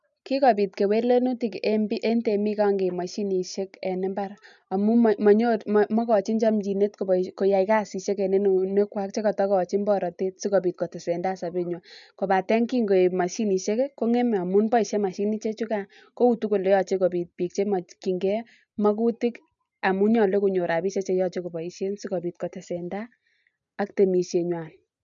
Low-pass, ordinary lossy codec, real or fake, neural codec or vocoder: 7.2 kHz; none; real; none